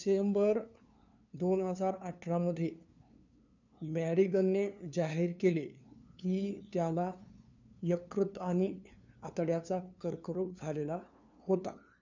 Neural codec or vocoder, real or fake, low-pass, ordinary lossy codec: codec, 16 kHz, 2 kbps, FunCodec, trained on LibriTTS, 25 frames a second; fake; 7.2 kHz; none